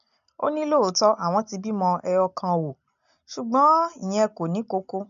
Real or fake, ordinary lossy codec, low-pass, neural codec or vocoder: real; none; 7.2 kHz; none